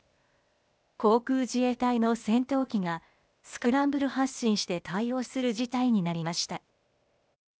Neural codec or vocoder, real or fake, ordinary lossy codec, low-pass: codec, 16 kHz, 0.8 kbps, ZipCodec; fake; none; none